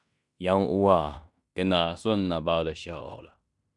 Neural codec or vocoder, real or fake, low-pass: codec, 16 kHz in and 24 kHz out, 0.9 kbps, LongCat-Audio-Codec, fine tuned four codebook decoder; fake; 10.8 kHz